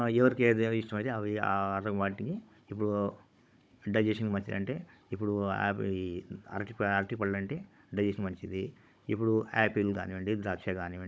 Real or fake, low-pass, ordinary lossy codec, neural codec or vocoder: fake; none; none; codec, 16 kHz, 16 kbps, FunCodec, trained on Chinese and English, 50 frames a second